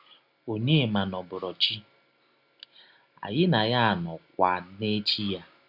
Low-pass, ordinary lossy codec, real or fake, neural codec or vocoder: 5.4 kHz; none; real; none